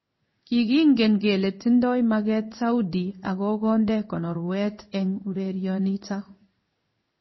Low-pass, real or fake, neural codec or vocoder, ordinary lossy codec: 7.2 kHz; fake; codec, 16 kHz in and 24 kHz out, 1 kbps, XY-Tokenizer; MP3, 24 kbps